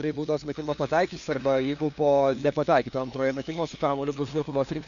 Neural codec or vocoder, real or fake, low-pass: codec, 16 kHz, 2 kbps, FunCodec, trained on LibriTTS, 25 frames a second; fake; 7.2 kHz